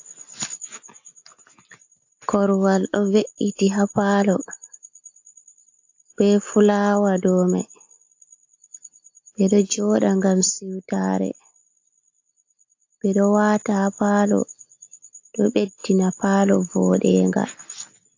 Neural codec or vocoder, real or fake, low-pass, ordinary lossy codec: none; real; 7.2 kHz; AAC, 48 kbps